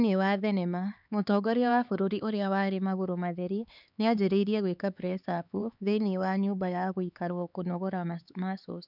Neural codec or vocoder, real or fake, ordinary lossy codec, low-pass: codec, 16 kHz, 4 kbps, X-Codec, HuBERT features, trained on LibriSpeech; fake; none; 5.4 kHz